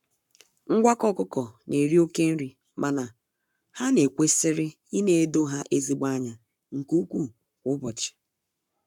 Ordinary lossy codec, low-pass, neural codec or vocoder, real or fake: none; 19.8 kHz; codec, 44.1 kHz, 7.8 kbps, Pupu-Codec; fake